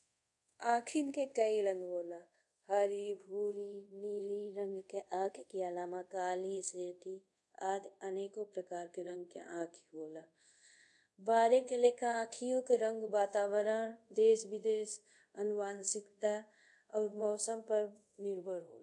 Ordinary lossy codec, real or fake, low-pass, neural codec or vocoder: none; fake; 10.8 kHz; codec, 24 kHz, 0.5 kbps, DualCodec